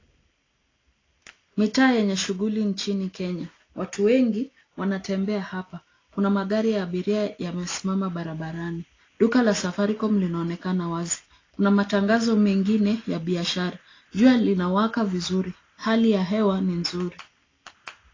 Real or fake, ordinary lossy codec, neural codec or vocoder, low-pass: real; AAC, 32 kbps; none; 7.2 kHz